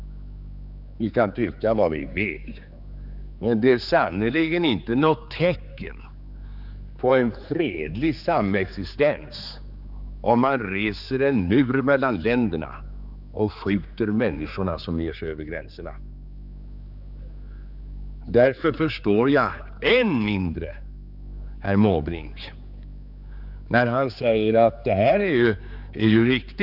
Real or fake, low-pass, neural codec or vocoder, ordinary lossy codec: fake; 5.4 kHz; codec, 16 kHz, 4 kbps, X-Codec, HuBERT features, trained on general audio; none